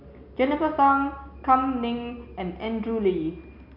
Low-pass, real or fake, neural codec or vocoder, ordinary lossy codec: 5.4 kHz; real; none; MP3, 48 kbps